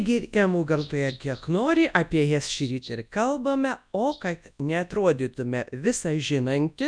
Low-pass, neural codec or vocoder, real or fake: 9.9 kHz; codec, 24 kHz, 0.9 kbps, WavTokenizer, large speech release; fake